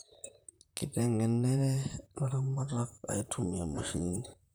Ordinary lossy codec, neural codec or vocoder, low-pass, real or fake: none; vocoder, 44.1 kHz, 128 mel bands, Pupu-Vocoder; none; fake